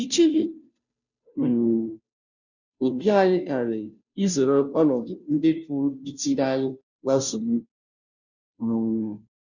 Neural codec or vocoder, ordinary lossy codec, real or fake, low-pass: codec, 16 kHz, 0.5 kbps, FunCodec, trained on Chinese and English, 25 frames a second; none; fake; 7.2 kHz